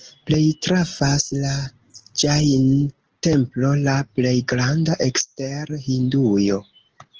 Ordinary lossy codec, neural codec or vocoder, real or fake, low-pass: Opus, 16 kbps; none; real; 7.2 kHz